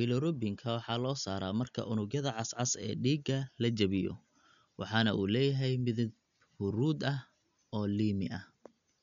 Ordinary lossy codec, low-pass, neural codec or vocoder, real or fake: none; 7.2 kHz; none; real